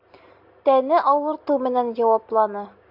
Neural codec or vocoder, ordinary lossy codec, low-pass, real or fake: none; AAC, 48 kbps; 5.4 kHz; real